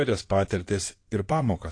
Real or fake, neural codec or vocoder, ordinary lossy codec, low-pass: fake; codec, 16 kHz in and 24 kHz out, 2.2 kbps, FireRedTTS-2 codec; AAC, 48 kbps; 9.9 kHz